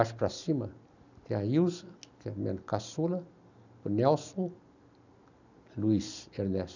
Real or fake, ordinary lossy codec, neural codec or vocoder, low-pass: real; none; none; 7.2 kHz